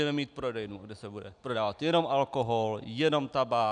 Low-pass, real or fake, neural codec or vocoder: 9.9 kHz; real; none